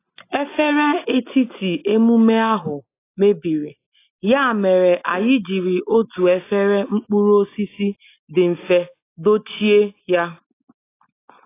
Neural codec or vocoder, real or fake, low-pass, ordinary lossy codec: none; real; 3.6 kHz; AAC, 24 kbps